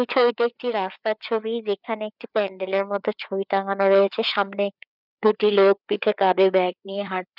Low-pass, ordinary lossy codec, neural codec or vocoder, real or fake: 5.4 kHz; none; codec, 16 kHz, 4 kbps, FreqCodec, larger model; fake